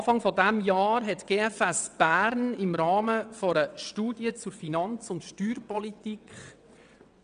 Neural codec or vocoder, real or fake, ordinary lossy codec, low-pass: vocoder, 22.05 kHz, 80 mel bands, WaveNeXt; fake; none; 9.9 kHz